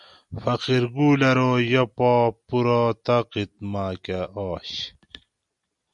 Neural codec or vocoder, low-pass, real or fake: none; 10.8 kHz; real